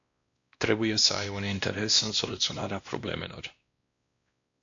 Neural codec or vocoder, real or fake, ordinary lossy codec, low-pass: codec, 16 kHz, 1 kbps, X-Codec, WavLM features, trained on Multilingual LibriSpeech; fake; AAC, 48 kbps; 7.2 kHz